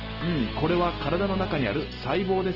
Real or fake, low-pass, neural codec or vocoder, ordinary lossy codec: real; 5.4 kHz; none; Opus, 16 kbps